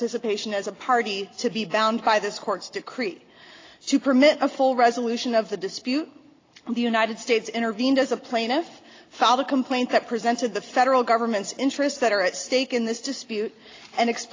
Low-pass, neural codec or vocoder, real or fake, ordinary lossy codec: 7.2 kHz; none; real; AAC, 32 kbps